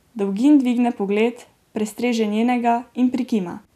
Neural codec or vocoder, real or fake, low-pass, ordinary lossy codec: none; real; 14.4 kHz; none